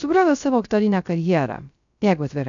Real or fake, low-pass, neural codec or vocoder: fake; 7.2 kHz; codec, 16 kHz, 0.3 kbps, FocalCodec